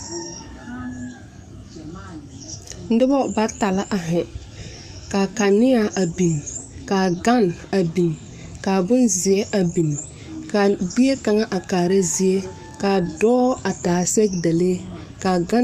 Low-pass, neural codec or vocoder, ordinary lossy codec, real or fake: 14.4 kHz; codec, 44.1 kHz, 7.8 kbps, DAC; MP3, 96 kbps; fake